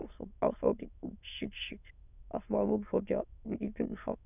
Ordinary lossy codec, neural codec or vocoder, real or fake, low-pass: none; autoencoder, 22.05 kHz, a latent of 192 numbers a frame, VITS, trained on many speakers; fake; 3.6 kHz